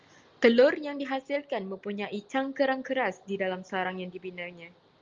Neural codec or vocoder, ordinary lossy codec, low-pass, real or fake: none; Opus, 32 kbps; 7.2 kHz; real